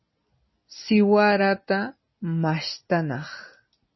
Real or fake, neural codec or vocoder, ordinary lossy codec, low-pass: real; none; MP3, 24 kbps; 7.2 kHz